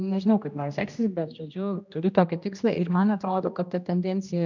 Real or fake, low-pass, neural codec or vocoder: fake; 7.2 kHz; codec, 16 kHz, 1 kbps, X-Codec, HuBERT features, trained on general audio